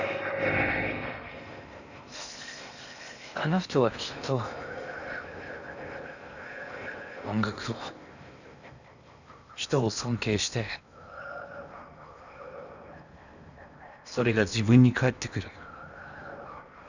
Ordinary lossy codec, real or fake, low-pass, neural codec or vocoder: none; fake; 7.2 kHz; codec, 16 kHz in and 24 kHz out, 0.8 kbps, FocalCodec, streaming, 65536 codes